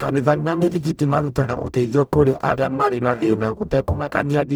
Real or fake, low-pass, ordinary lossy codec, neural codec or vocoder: fake; none; none; codec, 44.1 kHz, 0.9 kbps, DAC